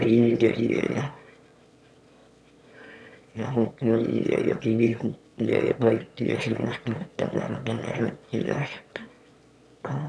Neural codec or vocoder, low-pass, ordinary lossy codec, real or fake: autoencoder, 22.05 kHz, a latent of 192 numbers a frame, VITS, trained on one speaker; none; none; fake